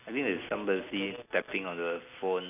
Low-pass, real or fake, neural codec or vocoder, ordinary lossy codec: 3.6 kHz; real; none; none